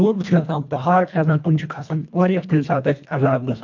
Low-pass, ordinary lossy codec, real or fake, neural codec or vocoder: 7.2 kHz; MP3, 64 kbps; fake; codec, 24 kHz, 1.5 kbps, HILCodec